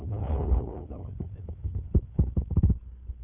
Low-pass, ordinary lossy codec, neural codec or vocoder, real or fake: 3.6 kHz; none; codec, 16 kHz, 8 kbps, FunCodec, trained on LibriTTS, 25 frames a second; fake